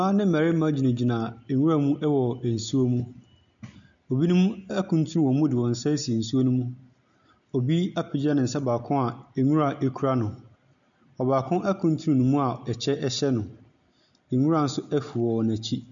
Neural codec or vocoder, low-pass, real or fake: none; 7.2 kHz; real